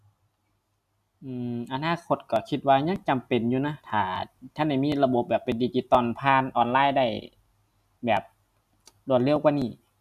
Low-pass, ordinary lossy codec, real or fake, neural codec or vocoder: 14.4 kHz; none; real; none